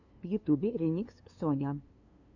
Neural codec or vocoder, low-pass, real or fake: codec, 16 kHz, 2 kbps, FunCodec, trained on LibriTTS, 25 frames a second; 7.2 kHz; fake